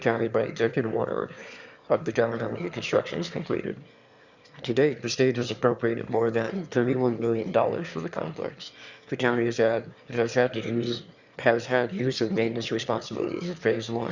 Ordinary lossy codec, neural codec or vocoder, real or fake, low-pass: Opus, 64 kbps; autoencoder, 22.05 kHz, a latent of 192 numbers a frame, VITS, trained on one speaker; fake; 7.2 kHz